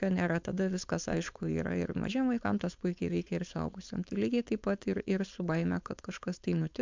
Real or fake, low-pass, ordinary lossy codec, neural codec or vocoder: fake; 7.2 kHz; MP3, 64 kbps; codec, 16 kHz, 4.8 kbps, FACodec